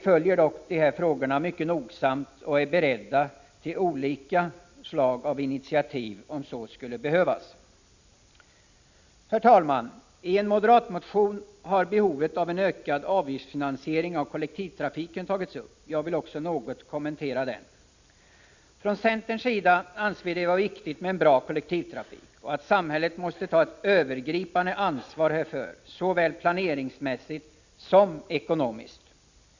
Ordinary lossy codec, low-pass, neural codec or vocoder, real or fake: Opus, 64 kbps; 7.2 kHz; none; real